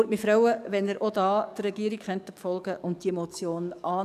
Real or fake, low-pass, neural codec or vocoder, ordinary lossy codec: fake; 14.4 kHz; codec, 44.1 kHz, 7.8 kbps, DAC; none